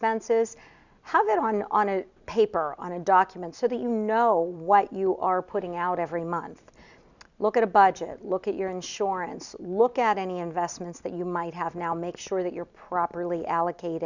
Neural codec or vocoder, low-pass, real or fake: none; 7.2 kHz; real